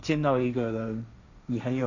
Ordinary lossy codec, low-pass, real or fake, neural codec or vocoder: none; 7.2 kHz; fake; codec, 16 kHz, 1.1 kbps, Voila-Tokenizer